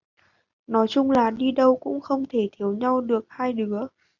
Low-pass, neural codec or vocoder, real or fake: 7.2 kHz; none; real